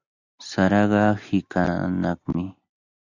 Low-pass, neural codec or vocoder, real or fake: 7.2 kHz; none; real